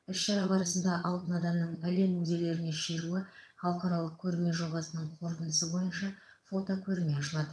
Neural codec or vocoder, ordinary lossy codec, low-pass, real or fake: vocoder, 22.05 kHz, 80 mel bands, HiFi-GAN; none; none; fake